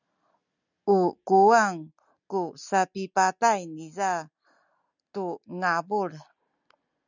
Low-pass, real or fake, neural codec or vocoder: 7.2 kHz; real; none